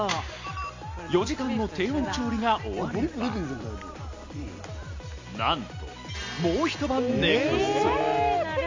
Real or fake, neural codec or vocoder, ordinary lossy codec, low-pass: real; none; MP3, 48 kbps; 7.2 kHz